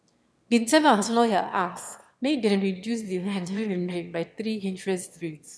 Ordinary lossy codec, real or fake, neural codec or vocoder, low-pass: none; fake; autoencoder, 22.05 kHz, a latent of 192 numbers a frame, VITS, trained on one speaker; none